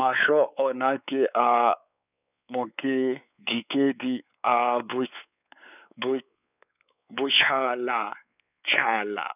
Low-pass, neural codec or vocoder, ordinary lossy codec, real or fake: 3.6 kHz; codec, 16 kHz, 4 kbps, X-Codec, WavLM features, trained on Multilingual LibriSpeech; none; fake